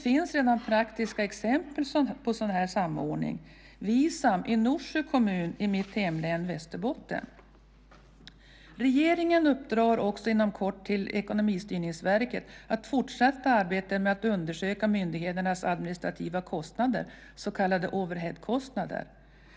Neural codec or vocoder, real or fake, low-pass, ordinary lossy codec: none; real; none; none